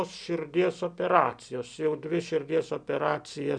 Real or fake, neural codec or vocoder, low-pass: real; none; 9.9 kHz